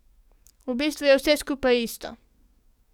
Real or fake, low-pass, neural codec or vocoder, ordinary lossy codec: fake; 19.8 kHz; codec, 44.1 kHz, 7.8 kbps, DAC; none